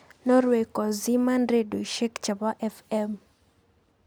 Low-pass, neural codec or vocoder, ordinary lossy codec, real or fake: none; none; none; real